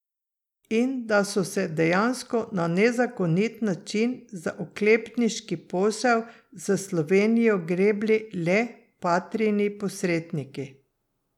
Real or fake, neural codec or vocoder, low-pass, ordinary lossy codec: real; none; 19.8 kHz; none